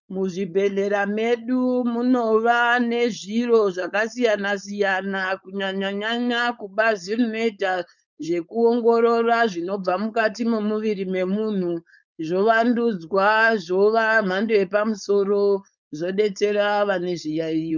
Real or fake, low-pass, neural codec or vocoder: fake; 7.2 kHz; codec, 16 kHz, 4.8 kbps, FACodec